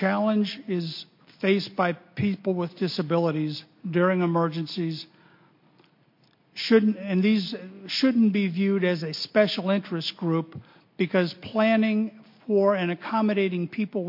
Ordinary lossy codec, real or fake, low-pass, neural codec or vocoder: MP3, 32 kbps; real; 5.4 kHz; none